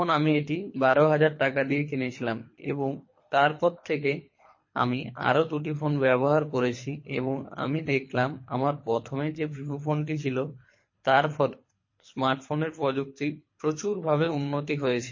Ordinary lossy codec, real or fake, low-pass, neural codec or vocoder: MP3, 32 kbps; fake; 7.2 kHz; codec, 24 kHz, 3 kbps, HILCodec